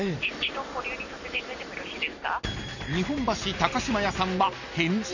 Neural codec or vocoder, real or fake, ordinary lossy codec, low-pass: none; real; none; 7.2 kHz